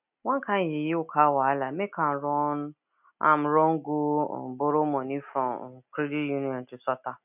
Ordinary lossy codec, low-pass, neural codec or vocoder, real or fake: none; 3.6 kHz; none; real